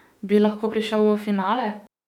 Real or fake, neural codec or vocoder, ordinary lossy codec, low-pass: fake; autoencoder, 48 kHz, 32 numbers a frame, DAC-VAE, trained on Japanese speech; none; 19.8 kHz